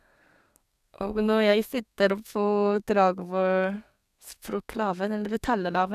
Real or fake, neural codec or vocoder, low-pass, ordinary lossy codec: fake; codec, 32 kHz, 1.9 kbps, SNAC; 14.4 kHz; none